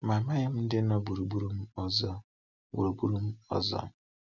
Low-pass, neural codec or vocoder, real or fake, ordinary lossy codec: 7.2 kHz; none; real; none